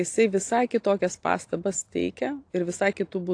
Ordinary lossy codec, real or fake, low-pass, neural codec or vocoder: AAC, 48 kbps; real; 9.9 kHz; none